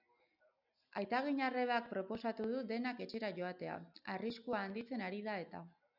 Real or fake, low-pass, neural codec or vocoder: real; 5.4 kHz; none